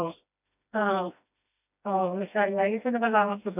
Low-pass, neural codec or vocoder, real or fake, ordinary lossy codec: 3.6 kHz; codec, 16 kHz, 1 kbps, FreqCodec, smaller model; fake; none